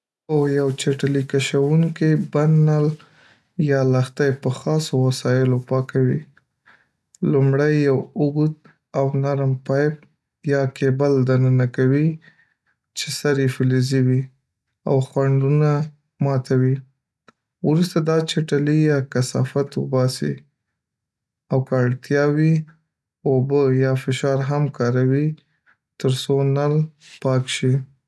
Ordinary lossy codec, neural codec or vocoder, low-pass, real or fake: none; none; none; real